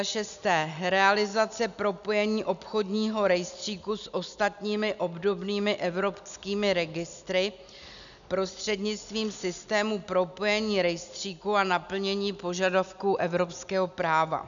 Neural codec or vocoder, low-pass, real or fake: none; 7.2 kHz; real